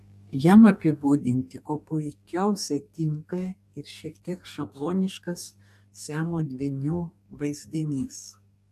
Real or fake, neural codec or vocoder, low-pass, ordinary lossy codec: fake; codec, 44.1 kHz, 2.6 kbps, DAC; 14.4 kHz; AAC, 96 kbps